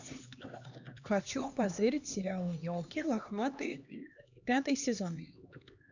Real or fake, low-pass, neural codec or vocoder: fake; 7.2 kHz; codec, 16 kHz, 2 kbps, X-Codec, HuBERT features, trained on LibriSpeech